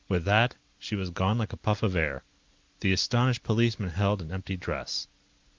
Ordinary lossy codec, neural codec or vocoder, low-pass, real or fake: Opus, 24 kbps; none; 7.2 kHz; real